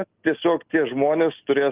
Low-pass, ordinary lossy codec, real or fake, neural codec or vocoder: 3.6 kHz; Opus, 24 kbps; real; none